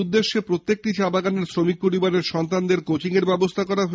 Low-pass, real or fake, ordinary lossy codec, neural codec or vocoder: none; real; none; none